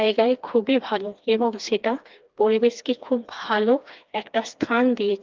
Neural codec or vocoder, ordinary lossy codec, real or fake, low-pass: codec, 16 kHz, 2 kbps, FreqCodec, smaller model; Opus, 24 kbps; fake; 7.2 kHz